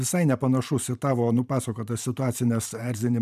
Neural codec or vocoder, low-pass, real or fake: vocoder, 44.1 kHz, 128 mel bands every 512 samples, BigVGAN v2; 14.4 kHz; fake